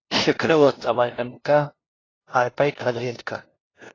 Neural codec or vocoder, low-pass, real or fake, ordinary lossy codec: codec, 16 kHz, 0.5 kbps, FunCodec, trained on LibriTTS, 25 frames a second; 7.2 kHz; fake; AAC, 32 kbps